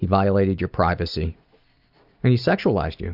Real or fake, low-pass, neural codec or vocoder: real; 5.4 kHz; none